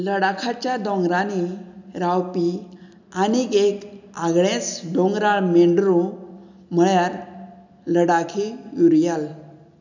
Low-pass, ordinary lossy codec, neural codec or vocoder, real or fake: 7.2 kHz; none; none; real